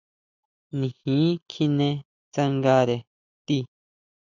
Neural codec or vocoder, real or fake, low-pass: none; real; 7.2 kHz